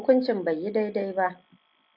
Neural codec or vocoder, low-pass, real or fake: none; 5.4 kHz; real